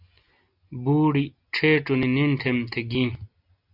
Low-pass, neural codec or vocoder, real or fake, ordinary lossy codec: 5.4 kHz; none; real; AAC, 48 kbps